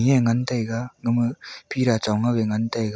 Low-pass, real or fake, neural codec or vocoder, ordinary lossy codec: none; real; none; none